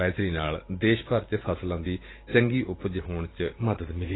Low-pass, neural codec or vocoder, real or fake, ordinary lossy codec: 7.2 kHz; vocoder, 44.1 kHz, 128 mel bands every 512 samples, BigVGAN v2; fake; AAC, 16 kbps